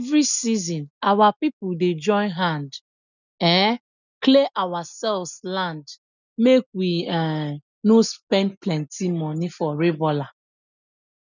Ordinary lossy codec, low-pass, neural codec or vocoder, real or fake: none; 7.2 kHz; none; real